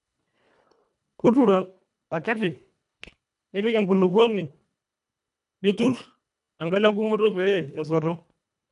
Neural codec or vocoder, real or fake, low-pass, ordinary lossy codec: codec, 24 kHz, 1.5 kbps, HILCodec; fake; 10.8 kHz; none